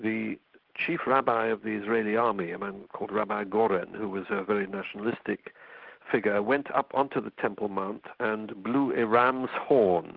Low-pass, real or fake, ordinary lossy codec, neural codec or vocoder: 5.4 kHz; real; Opus, 16 kbps; none